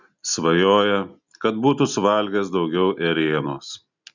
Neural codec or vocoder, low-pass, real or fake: none; 7.2 kHz; real